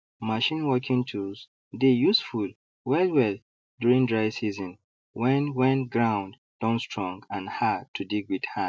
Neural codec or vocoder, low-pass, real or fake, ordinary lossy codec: none; none; real; none